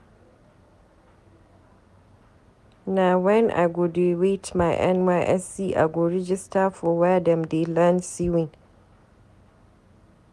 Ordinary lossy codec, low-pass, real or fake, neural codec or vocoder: none; none; real; none